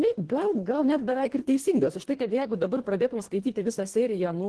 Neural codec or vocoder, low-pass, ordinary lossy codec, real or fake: codec, 24 kHz, 1.5 kbps, HILCodec; 10.8 kHz; Opus, 16 kbps; fake